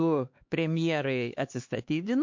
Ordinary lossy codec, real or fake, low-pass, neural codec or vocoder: MP3, 48 kbps; fake; 7.2 kHz; codec, 16 kHz, 2 kbps, X-Codec, HuBERT features, trained on LibriSpeech